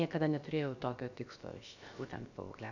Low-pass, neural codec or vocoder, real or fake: 7.2 kHz; codec, 16 kHz, about 1 kbps, DyCAST, with the encoder's durations; fake